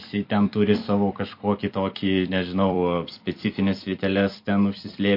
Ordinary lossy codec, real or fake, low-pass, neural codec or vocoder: MP3, 32 kbps; fake; 5.4 kHz; vocoder, 44.1 kHz, 128 mel bands every 256 samples, BigVGAN v2